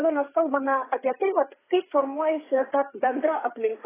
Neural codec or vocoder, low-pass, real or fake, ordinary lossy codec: codec, 16 kHz, 4 kbps, FreqCodec, larger model; 3.6 kHz; fake; AAC, 16 kbps